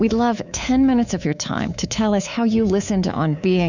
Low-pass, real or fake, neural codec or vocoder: 7.2 kHz; fake; codec, 24 kHz, 3.1 kbps, DualCodec